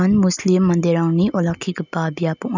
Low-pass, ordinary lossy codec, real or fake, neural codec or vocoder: 7.2 kHz; none; real; none